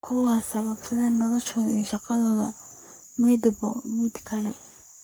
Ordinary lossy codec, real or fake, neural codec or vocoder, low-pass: none; fake; codec, 44.1 kHz, 3.4 kbps, Pupu-Codec; none